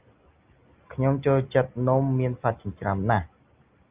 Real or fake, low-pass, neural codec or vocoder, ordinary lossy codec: real; 3.6 kHz; none; Opus, 24 kbps